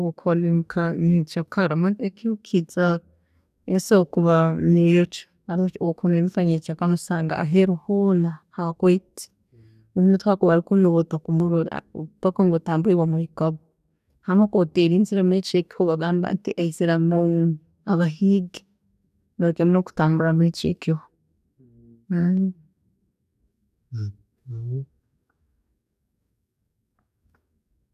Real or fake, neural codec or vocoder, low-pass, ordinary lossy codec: fake; codec, 44.1 kHz, 2.6 kbps, DAC; 14.4 kHz; none